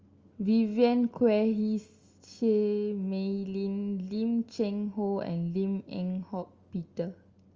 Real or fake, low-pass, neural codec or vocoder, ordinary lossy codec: real; 7.2 kHz; none; Opus, 32 kbps